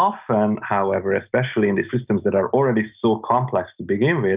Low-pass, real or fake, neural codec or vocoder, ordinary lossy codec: 3.6 kHz; real; none; Opus, 24 kbps